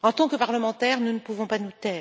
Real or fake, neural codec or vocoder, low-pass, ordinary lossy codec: real; none; none; none